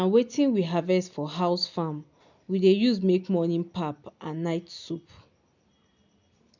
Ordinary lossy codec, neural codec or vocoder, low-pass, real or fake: none; none; 7.2 kHz; real